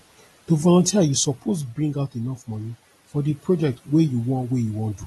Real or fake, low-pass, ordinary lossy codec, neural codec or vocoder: real; 19.8 kHz; AAC, 32 kbps; none